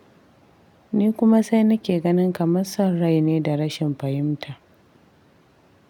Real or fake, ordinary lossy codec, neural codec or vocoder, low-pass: real; none; none; 19.8 kHz